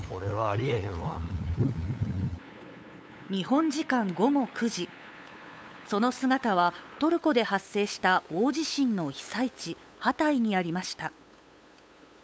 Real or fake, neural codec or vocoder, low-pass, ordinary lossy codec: fake; codec, 16 kHz, 8 kbps, FunCodec, trained on LibriTTS, 25 frames a second; none; none